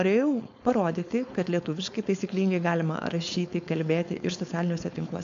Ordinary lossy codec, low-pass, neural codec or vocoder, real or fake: AAC, 64 kbps; 7.2 kHz; codec, 16 kHz, 4.8 kbps, FACodec; fake